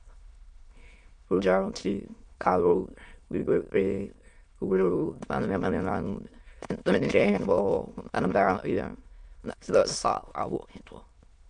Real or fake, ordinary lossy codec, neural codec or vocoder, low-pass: fake; MP3, 64 kbps; autoencoder, 22.05 kHz, a latent of 192 numbers a frame, VITS, trained on many speakers; 9.9 kHz